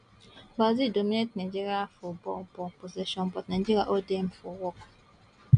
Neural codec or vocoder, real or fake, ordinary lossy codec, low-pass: none; real; none; 9.9 kHz